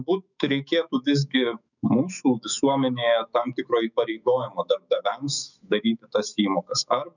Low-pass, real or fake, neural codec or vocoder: 7.2 kHz; fake; autoencoder, 48 kHz, 128 numbers a frame, DAC-VAE, trained on Japanese speech